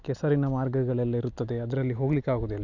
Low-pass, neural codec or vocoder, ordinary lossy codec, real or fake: 7.2 kHz; none; none; real